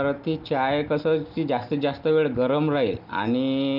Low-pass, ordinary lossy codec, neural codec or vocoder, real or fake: 5.4 kHz; Opus, 24 kbps; none; real